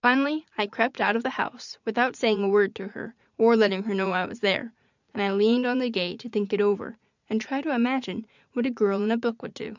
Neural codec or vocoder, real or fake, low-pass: vocoder, 44.1 kHz, 80 mel bands, Vocos; fake; 7.2 kHz